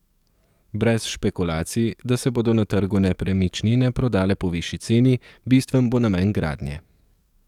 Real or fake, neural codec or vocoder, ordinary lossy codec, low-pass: fake; codec, 44.1 kHz, 7.8 kbps, DAC; none; 19.8 kHz